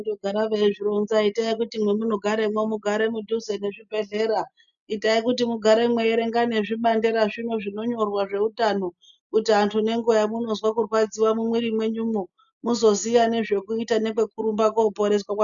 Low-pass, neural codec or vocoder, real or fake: 7.2 kHz; none; real